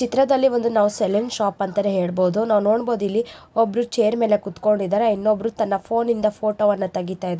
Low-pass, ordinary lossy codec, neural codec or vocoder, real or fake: none; none; none; real